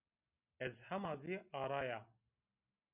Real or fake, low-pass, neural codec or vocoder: real; 3.6 kHz; none